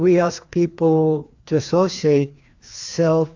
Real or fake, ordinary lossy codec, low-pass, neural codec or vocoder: fake; AAC, 48 kbps; 7.2 kHz; codec, 16 kHz, 2 kbps, FreqCodec, larger model